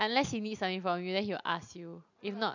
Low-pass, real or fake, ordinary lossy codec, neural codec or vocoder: 7.2 kHz; real; none; none